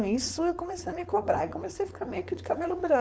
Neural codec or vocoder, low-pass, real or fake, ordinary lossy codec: codec, 16 kHz, 4.8 kbps, FACodec; none; fake; none